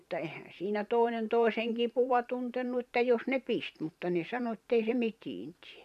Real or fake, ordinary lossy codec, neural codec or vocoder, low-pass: fake; none; vocoder, 44.1 kHz, 128 mel bands every 512 samples, BigVGAN v2; 14.4 kHz